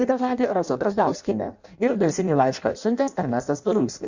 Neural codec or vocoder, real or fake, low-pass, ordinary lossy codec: codec, 16 kHz in and 24 kHz out, 0.6 kbps, FireRedTTS-2 codec; fake; 7.2 kHz; Opus, 64 kbps